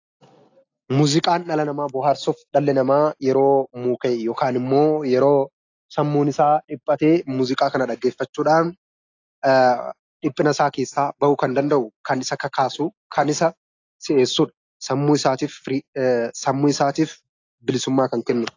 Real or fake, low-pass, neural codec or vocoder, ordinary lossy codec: real; 7.2 kHz; none; AAC, 48 kbps